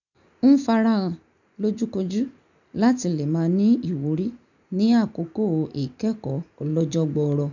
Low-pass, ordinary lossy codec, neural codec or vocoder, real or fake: 7.2 kHz; none; none; real